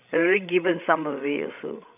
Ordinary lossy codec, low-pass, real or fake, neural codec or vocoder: none; 3.6 kHz; fake; codec, 16 kHz, 16 kbps, FreqCodec, larger model